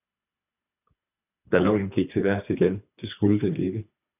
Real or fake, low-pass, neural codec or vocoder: fake; 3.6 kHz; codec, 24 kHz, 3 kbps, HILCodec